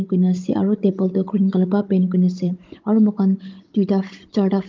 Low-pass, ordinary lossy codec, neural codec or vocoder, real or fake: 7.2 kHz; Opus, 24 kbps; codec, 16 kHz, 16 kbps, FunCodec, trained on Chinese and English, 50 frames a second; fake